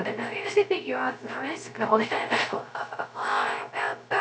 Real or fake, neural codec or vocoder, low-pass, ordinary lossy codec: fake; codec, 16 kHz, 0.3 kbps, FocalCodec; none; none